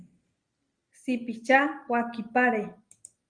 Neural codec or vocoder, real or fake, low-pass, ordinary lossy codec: none; real; 9.9 kHz; Opus, 32 kbps